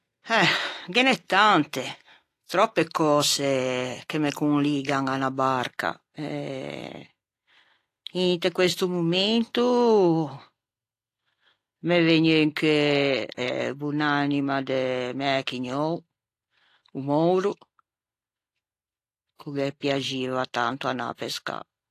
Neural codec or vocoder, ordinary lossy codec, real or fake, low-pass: none; AAC, 48 kbps; real; 14.4 kHz